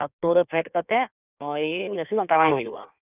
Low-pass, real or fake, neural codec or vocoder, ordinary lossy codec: 3.6 kHz; fake; codec, 16 kHz in and 24 kHz out, 1.1 kbps, FireRedTTS-2 codec; none